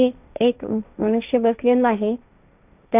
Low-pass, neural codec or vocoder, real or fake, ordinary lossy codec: 3.6 kHz; codec, 16 kHz, 1.1 kbps, Voila-Tokenizer; fake; none